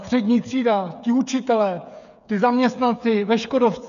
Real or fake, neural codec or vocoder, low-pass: fake; codec, 16 kHz, 8 kbps, FreqCodec, smaller model; 7.2 kHz